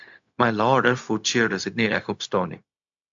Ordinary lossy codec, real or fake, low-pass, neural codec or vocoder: AAC, 64 kbps; fake; 7.2 kHz; codec, 16 kHz, 0.4 kbps, LongCat-Audio-Codec